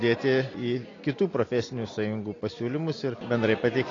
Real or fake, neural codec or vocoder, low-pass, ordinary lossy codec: real; none; 7.2 kHz; AAC, 32 kbps